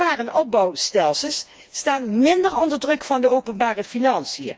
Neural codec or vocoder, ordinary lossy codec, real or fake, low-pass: codec, 16 kHz, 2 kbps, FreqCodec, smaller model; none; fake; none